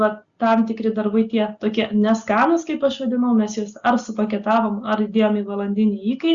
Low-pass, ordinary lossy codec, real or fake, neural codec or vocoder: 7.2 kHz; Opus, 64 kbps; real; none